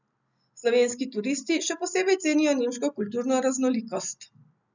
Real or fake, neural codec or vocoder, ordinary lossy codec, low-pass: real; none; none; 7.2 kHz